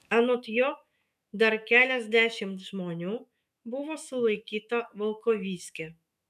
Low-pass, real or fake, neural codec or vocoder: 14.4 kHz; fake; autoencoder, 48 kHz, 128 numbers a frame, DAC-VAE, trained on Japanese speech